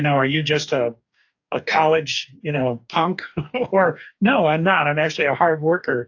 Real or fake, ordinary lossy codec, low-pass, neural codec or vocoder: fake; AAC, 48 kbps; 7.2 kHz; codec, 44.1 kHz, 2.6 kbps, DAC